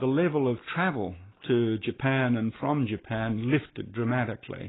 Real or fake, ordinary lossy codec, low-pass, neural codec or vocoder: real; AAC, 16 kbps; 7.2 kHz; none